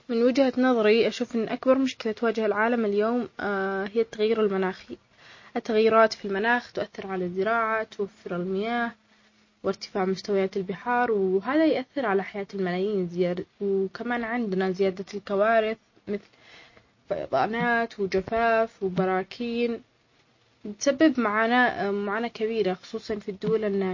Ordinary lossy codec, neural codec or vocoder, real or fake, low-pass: MP3, 32 kbps; none; real; 7.2 kHz